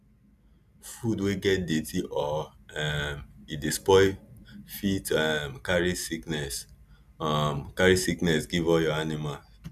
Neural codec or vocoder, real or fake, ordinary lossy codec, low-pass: none; real; none; 14.4 kHz